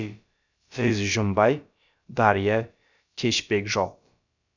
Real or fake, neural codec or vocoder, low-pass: fake; codec, 16 kHz, about 1 kbps, DyCAST, with the encoder's durations; 7.2 kHz